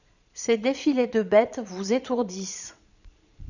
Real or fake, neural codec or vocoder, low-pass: fake; vocoder, 22.05 kHz, 80 mel bands, Vocos; 7.2 kHz